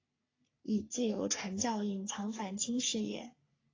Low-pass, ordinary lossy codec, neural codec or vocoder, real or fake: 7.2 kHz; AAC, 32 kbps; codec, 44.1 kHz, 3.4 kbps, Pupu-Codec; fake